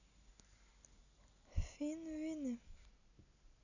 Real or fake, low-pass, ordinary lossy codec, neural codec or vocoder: real; 7.2 kHz; none; none